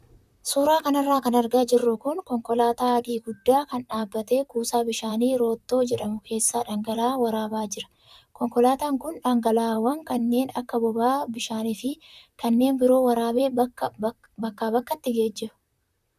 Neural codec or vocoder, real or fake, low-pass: vocoder, 44.1 kHz, 128 mel bands, Pupu-Vocoder; fake; 14.4 kHz